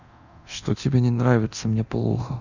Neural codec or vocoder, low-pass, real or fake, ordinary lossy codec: codec, 24 kHz, 0.9 kbps, DualCodec; 7.2 kHz; fake; none